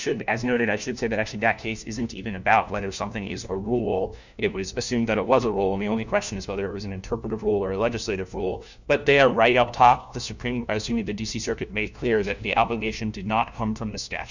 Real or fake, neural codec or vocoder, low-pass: fake; codec, 16 kHz, 1 kbps, FunCodec, trained on LibriTTS, 50 frames a second; 7.2 kHz